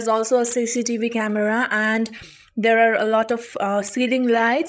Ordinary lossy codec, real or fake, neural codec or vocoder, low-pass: none; fake; codec, 16 kHz, 16 kbps, FreqCodec, larger model; none